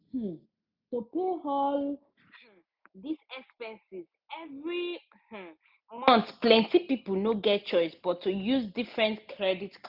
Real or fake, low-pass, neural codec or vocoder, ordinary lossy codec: real; 5.4 kHz; none; none